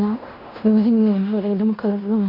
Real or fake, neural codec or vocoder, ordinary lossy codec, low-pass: fake; codec, 16 kHz in and 24 kHz out, 0.9 kbps, LongCat-Audio-Codec, fine tuned four codebook decoder; none; 5.4 kHz